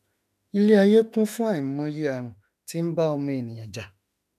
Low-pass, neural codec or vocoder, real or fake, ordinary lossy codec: 14.4 kHz; autoencoder, 48 kHz, 32 numbers a frame, DAC-VAE, trained on Japanese speech; fake; none